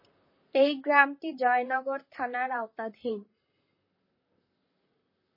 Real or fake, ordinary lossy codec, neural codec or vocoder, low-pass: fake; MP3, 24 kbps; vocoder, 44.1 kHz, 128 mel bands, Pupu-Vocoder; 5.4 kHz